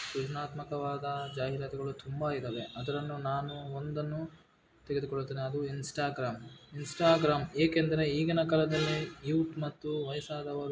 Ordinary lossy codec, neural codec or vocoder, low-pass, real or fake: none; none; none; real